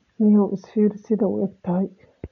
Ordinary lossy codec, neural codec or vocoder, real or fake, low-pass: none; none; real; 7.2 kHz